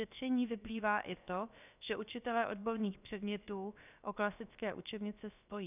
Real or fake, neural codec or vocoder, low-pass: fake; codec, 16 kHz, about 1 kbps, DyCAST, with the encoder's durations; 3.6 kHz